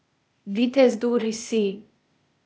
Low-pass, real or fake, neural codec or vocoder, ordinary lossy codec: none; fake; codec, 16 kHz, 0.8 kbps, ZipCodec; none